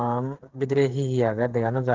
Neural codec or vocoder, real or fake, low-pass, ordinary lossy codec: codec, 16 kHz, 8 kbps, FreqCodec, smaller model; fake; 7.2 kHz; Opus, 16 kbps